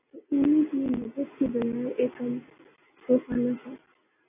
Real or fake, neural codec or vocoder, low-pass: real; none; 3.6 kHz